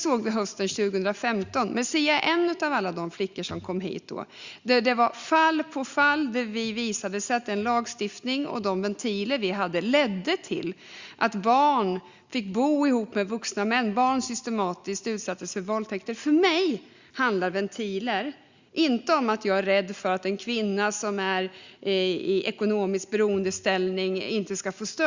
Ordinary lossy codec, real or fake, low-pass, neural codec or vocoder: Opus, 64 kbps; real; 7.2 kHz; none